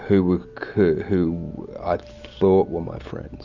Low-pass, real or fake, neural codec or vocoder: 7.2 kHz; real; none